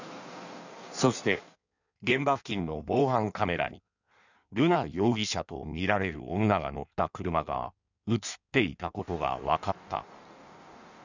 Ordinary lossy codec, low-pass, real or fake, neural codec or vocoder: none; 7.2 kHz; fake; codec, 16 kHz in and 24 kHz out, 1.1 kbps, FireRedTTS-2 codec